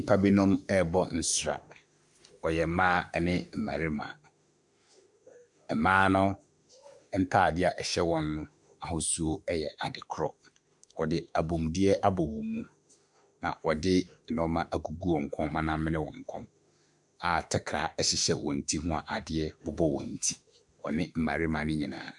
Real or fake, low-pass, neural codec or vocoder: fake; 10.8 kHz; autoencoder, 48 kHz, 32 numbers a frame, DAC-VAE, trained on Japanese speech